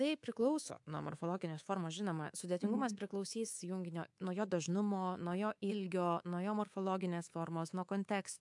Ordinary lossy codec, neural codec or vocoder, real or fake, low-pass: AAC, 64 kbps; codec, 24 kHz, 3.1 kbps, DualCodec; fake; 10.8 kHz